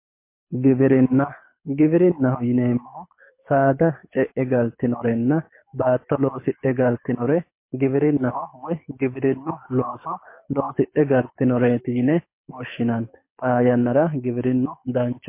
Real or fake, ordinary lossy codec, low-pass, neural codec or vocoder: fake; MP3, 24 kbps; 3.6 kHz; codec, 24 kHz, 6 kbps, HILCodec